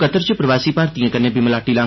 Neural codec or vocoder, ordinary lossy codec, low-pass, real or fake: none; MP3, 24 kbps; 7.2 kHz; real